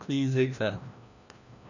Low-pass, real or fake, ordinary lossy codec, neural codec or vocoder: 7.2 kHz; fake; none; codec, 16 kHz, 1 kbps, FreqCodec, larger model